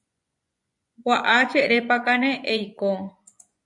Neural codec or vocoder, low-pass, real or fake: vocoder, 24 kHz, 100 mel bands, Vocos; 10.8 kHz; fake